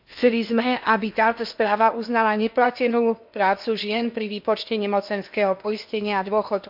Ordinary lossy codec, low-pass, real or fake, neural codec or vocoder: MP3, 48 kbps; 5.4 kHz; fake; codec, 16 kHz in and 24 kHz out, 0.8 kbps, FocalCodec, streaming, 65536 codes